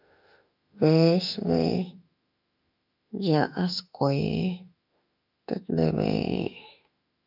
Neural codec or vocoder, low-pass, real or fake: autoencoder, 48 kHz, 32 numbers a frame, DAC-VAE, trained on Japanese speech; 5.4 kHz; fake